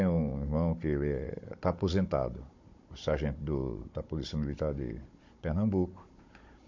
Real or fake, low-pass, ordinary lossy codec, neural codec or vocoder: fake; 7.2 kHz; MP3, 48 kbps; codec, 16 kHz, 16 kbps, FunCodec, trained on Chinese and English, 50 frames a second